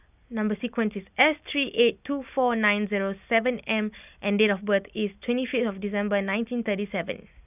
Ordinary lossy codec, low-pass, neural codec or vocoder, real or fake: none; 3.6 kHz; none; real